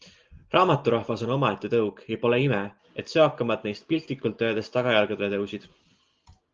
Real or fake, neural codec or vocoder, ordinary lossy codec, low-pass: real; none; Opus, 24 kbps; 7.2 kHz